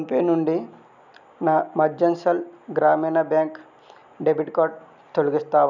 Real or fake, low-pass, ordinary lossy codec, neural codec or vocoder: real; 7.2 kHz; none; none